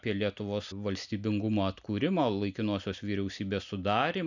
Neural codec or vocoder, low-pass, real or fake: none; 7.2 kHz; real